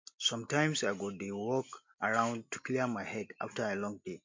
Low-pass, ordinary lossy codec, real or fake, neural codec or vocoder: 7.2 kHz; MP3, 48 kbps; real; none